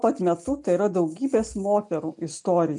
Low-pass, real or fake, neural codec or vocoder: 10.8 kHz; real; none